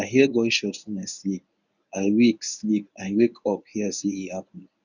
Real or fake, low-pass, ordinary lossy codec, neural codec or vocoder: fake; 7.2 kHz; none; codec, 24 kHz, 0.9 kbps, WavTokenizer, medium speech release version 1